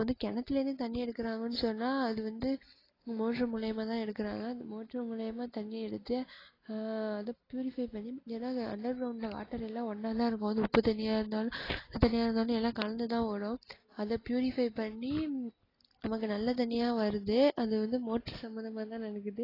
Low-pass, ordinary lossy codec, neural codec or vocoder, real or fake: 5.4 kHz; AAC, 24 kbps; none; real